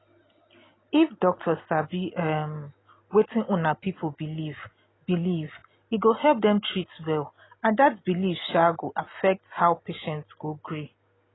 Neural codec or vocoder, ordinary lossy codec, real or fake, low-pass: none; AAC, 16 kbps; real; 7.2 kHz